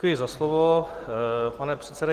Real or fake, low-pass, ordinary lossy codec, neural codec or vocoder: real; 14.4 kHz; Opus, 16 kbps; none